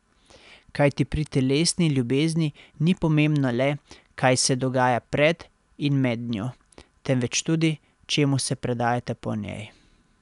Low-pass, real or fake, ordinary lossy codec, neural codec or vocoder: 10.8 kHz; real; none; none